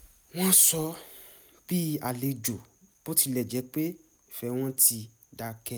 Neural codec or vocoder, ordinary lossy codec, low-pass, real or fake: none; none; none; real